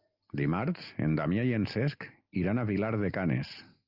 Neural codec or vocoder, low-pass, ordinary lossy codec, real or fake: none; 5.4 kHz; Opus, 24 kbps; real